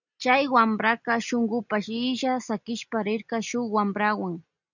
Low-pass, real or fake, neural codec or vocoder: 7.2 kHz; real; none